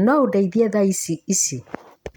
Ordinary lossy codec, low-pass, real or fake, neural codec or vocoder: none; none; real; none